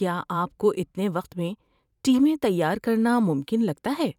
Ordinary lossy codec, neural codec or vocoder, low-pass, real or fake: none; vocoder, 44.1 kHz, 128 mel bands every 512 samples, BigVGAN v2; 19.8 kHz; fake